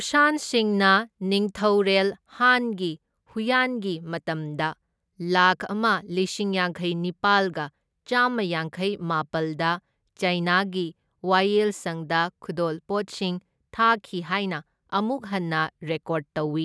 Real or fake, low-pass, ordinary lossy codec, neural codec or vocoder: real; none; none; none